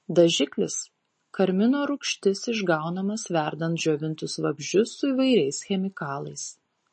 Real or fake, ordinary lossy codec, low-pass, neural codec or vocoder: real; MP3, 32 kbps; 10.8 kHz; none